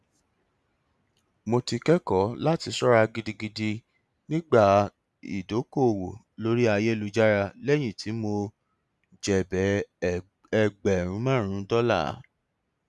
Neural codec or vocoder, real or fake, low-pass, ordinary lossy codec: none; real; none; none